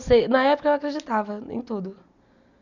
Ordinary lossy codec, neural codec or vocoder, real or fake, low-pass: none; none; real; 7.2 kHz